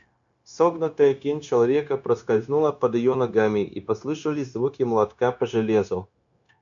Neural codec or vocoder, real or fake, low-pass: codec, 16 kHz, 0.9 kbps, LongCat-Audio-Codec; fake; 7.2 kHz